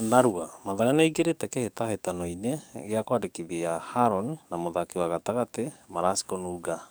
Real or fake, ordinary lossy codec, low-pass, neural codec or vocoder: fake; none; none; codec, 44.1 kHz, 7.8 kbps, DAC